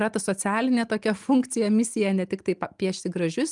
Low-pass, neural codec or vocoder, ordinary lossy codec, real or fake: 10.8 kHz; none; Opus, 32 kbps; real